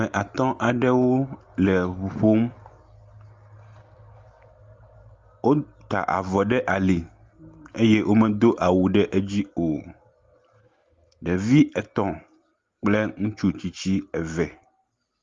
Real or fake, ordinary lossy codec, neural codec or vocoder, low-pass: real; Opus, 32 kbps; none; 7.2 kHz